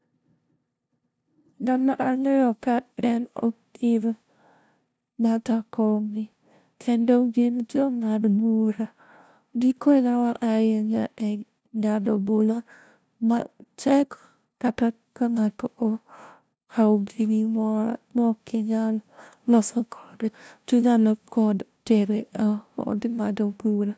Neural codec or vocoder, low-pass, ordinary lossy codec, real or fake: codec, 16 kHz, 0.5 kbps, FunCodec, trained on LibriTTS, 25 frames a second; none; none; fake